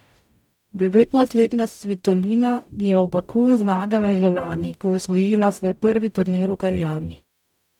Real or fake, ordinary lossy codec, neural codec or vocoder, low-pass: fake; none; codec, 44.1 kHz, 0.9 kbps, DAC; 19.8 kHz